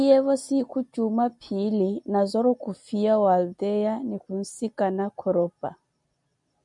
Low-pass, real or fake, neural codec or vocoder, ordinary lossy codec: 10.8 kHz; real; none; MP3, 96 kbps